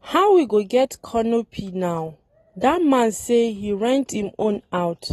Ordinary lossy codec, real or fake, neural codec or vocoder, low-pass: AAC, 32 kbps; real; none; 19.8 kHz